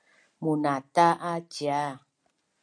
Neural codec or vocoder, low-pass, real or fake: none; 9.9 kHz; real